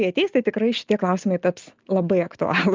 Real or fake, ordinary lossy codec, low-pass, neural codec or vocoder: real; Opus, 24 kbps; 7.2 kHz; none